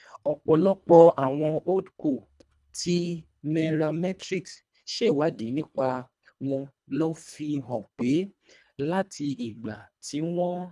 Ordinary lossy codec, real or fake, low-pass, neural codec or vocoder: none; fake; none; codec, 24 kHz, 1.5 kbps, HILCodec